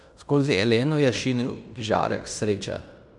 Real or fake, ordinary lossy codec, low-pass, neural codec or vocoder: fake; none; 10.8 kHz; codec, 16 kHz in and 24 kHz out, 0.9 kbps, LongCat-Audio-Codec, fine tuned four codebook decoder